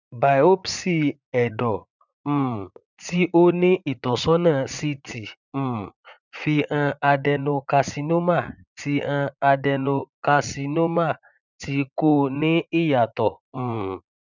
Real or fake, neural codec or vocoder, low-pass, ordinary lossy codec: fake; vocoder, 44.1 kHz, 80 mel bands, Vocos; 7.2 kHz; none